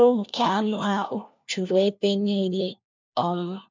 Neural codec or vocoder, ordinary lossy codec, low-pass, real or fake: codec, 16 kHz, 1 kbps, FunCodec, trained on LibriTTS, 50 frames a second; MP3, 64 kbps; 7.2 kHz; fake